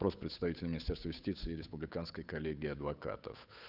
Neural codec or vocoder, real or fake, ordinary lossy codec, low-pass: codec, 16 kHz, 8 kbps, FunCodec, trained on Chinese and English, 25 frames a second; fake; none; 5.4 kHz